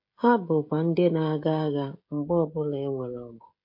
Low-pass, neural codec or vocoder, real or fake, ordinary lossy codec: 5.4 kHz; codec, 16 kHz, 16 kbps, FreqCodec, smaller model; fake; MP3, 32 kbps